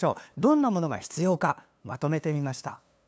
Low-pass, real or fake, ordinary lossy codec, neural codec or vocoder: none; fake; none; codec, 16 kHz, 2 kbps, FunCodec, trained on LibriTTS, 25 frames a second